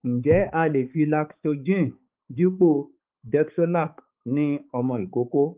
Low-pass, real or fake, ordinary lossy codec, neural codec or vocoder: 3.6 kHz; fake; Opus, 32 kbps; codec, 16 kHz, 4 kbps, X-Codec, HuBERT features, trained on balanced general audio